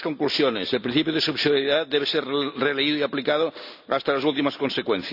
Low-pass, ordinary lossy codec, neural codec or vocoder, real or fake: 5.4 kHz; none; none; real